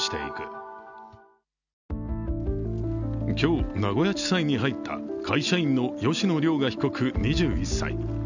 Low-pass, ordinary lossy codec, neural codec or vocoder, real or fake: 7.2 kHz; none; none; real